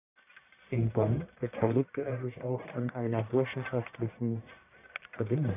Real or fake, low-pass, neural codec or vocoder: fake; 3.6 kHz; codec, 44.1 kHz, 1.7 kbps, Pupu-Codec